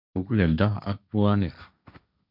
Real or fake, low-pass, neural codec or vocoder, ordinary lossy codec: fake; 5.4 kHz; codec, 16 kHz, 1.1 kbps, Voila-Tokenizer; none